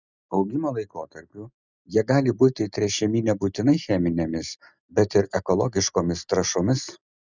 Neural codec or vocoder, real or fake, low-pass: none; real; 7.2 kHz